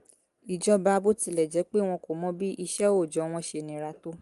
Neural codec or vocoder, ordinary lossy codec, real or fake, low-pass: none; Opus, 32 kbps; real; 10.8 kHz